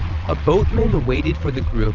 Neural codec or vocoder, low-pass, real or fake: vocoder, 22.05 kHz, 80 mel bands, Vocos; 7.2 kHz; fake